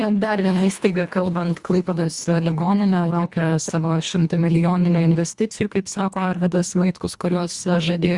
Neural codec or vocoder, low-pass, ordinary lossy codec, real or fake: codec, 24 kHz, 1.5 kbps, HILCodec; 10.8 kHz; Opus, 64 kbps; fake